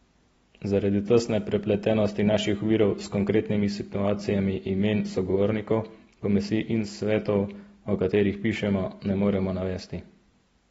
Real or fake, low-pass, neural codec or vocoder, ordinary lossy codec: real; 19.8 kHz; none; AAC, 24 kbps